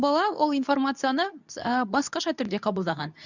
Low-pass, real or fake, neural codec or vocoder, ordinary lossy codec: 7.2 kHz; fake; codec, 24 kHz, 0.9 kbps, WavTokenizer, medium speech release version 2; none